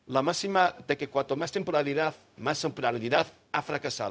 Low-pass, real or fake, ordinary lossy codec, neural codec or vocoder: none; fake; none; codec, 16 kHz, 0.4 kbps, LongCat-Audio-Codec